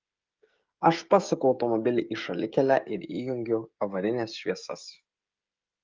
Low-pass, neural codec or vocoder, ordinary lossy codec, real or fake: 7.2 kHz; codec, 16 kHz, 16 kbps, FreqCodec, smaller model; Opus, 32 kbps; fake